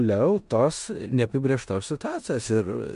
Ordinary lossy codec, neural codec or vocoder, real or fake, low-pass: MP3, 64 kbps; codec, 16 kHz in and 24 kHz out, 0.9 kbps, LongCat-Audio-Codec, four codebook decoder; fake; 10.8 kHz